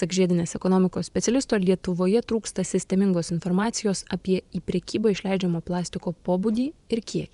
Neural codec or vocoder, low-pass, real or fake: vocoder, 24 kHz, 100 mel bands, Vocos; 10.8 kHz; fake